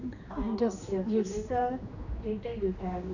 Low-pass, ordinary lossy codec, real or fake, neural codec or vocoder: 7.2 kHz; none; fake; codec, 16 kHz, 2 kbps, X-Codec, HuBERT features, trained on general audio